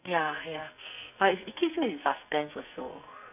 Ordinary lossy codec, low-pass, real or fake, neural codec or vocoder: none; 3.6 kHz; fake; codec, 44.1 kHz, 2.6 kbps, SNAC